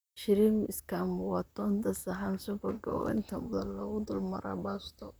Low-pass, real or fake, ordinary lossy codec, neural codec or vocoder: none; fake; none; vocoder, 44.1 kHz, 128 mel bands, Pupu-Vocoder